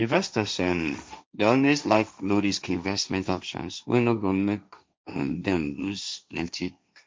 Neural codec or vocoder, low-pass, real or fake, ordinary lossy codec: codec, 16 kHz, 1.1 kbps, Voila-Tokenizer; none; fake; none